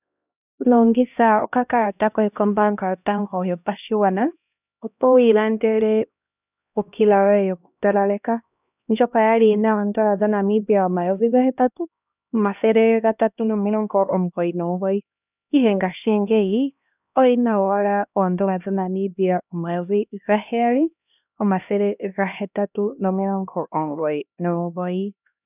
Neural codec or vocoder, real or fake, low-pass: codec, 16 kHz, 1 kbps, X-Codec, HuBERT features, trained on LibriSpeech; fake; 3.6 kHz